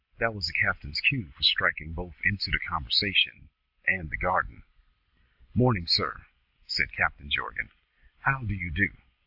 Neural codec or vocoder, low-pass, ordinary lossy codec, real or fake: none; 5.4 kHz; AAC, 48 kbps; real